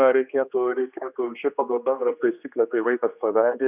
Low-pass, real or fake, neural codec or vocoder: 3.6 kHz; fake; codec, 16 kHz, 2 kbps, X-Codec, HuBERT features, trained on general audio